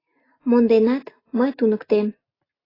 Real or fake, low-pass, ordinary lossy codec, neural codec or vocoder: real; 5.4 kHz; AAC, 24 kbps; none